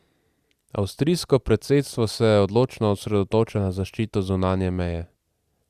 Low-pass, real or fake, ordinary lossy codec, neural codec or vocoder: 14.4 kHz; real; Opus, 64 kbps; none